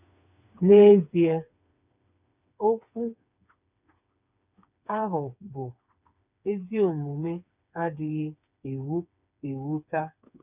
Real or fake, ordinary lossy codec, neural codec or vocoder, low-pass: fake; none; codec, 16 kHz, 4 kbps, FreqCodec, smaller model; 3.6 kHz